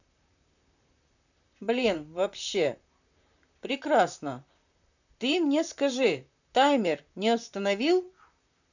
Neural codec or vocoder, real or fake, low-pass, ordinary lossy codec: vocoder, 44.1 kHz, 128 mel bands, Pupu-Vocoder; fake; 7.2 kHz; none